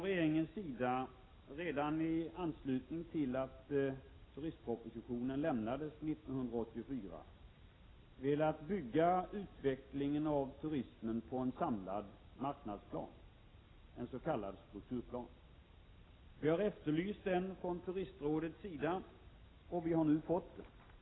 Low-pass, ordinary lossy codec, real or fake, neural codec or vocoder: 7.2 kHz; AAC, 16 kbps; real; none